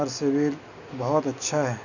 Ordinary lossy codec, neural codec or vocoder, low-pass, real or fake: none; none; 7.2 kHz; real